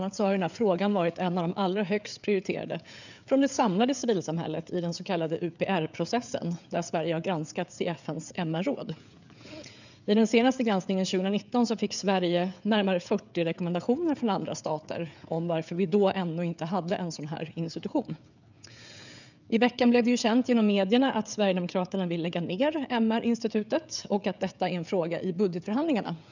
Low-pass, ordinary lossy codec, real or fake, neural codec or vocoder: 7.2 kHz; none; fake; codec, 16 kHz, 16 kbps, FreqCodec, smaller model